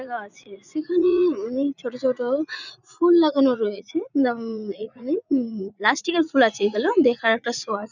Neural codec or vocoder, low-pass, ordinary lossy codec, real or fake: vocoder, 44.1 kHz, 80 mel bands, Vocos; 7.2 kHz; none; fake